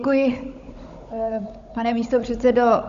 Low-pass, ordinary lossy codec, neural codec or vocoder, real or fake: 7.2 kHz; MP3, 48 kbps; codec, 16 kHz, 16 kbps, FunCodec, trained on Chinese and English, 50 frames a second; fake